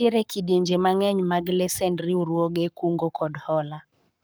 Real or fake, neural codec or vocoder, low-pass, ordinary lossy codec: fake; codec, 44.1 kHz, 7.8 kbps, Pupu-Codec; none; none